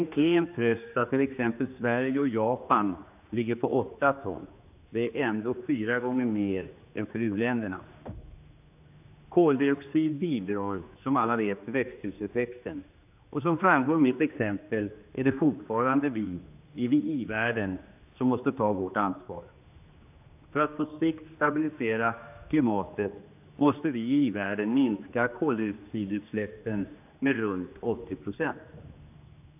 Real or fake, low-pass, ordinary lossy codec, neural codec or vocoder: fake; 3.6 kHz; AAC, 32 kbps; codec, 16 kHz, 2 kbps, X-Codec, HuBERT features, trained on general audio